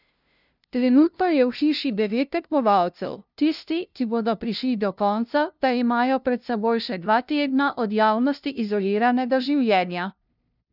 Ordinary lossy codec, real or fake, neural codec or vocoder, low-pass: none; fake; codec, 16 kHz, 0.5 kbps, FunCodec, trained on LibriTTS, 25 frames a second; 5.4 kHz